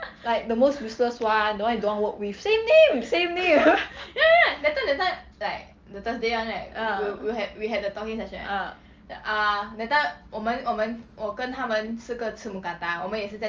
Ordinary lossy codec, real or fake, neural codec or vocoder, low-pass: Opus, 32 kbps; real; none; 7.2 kHz